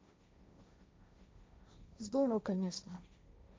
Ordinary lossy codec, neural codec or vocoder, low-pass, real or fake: none; codec, 16 kHz, 1.1 kbps, Voila-Tokenizer; none; fake